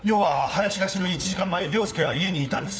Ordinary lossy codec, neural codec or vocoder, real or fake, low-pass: none; codec, 16 kHz, 4 kbps, FunCodec, trained on LibriTTS, 50 frames a second; fake; none